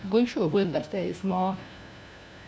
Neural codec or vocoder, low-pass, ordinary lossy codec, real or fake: codec, 16 kHz, 1 kbps, FunCodec, trained on LibriTTS, 50 frames a second; none; none; fake